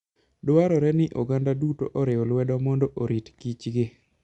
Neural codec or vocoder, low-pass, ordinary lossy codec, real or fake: none; 10.8 kHz; Opus, 64 kbps; real